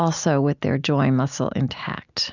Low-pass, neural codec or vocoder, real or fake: 7.2 kHz; none; real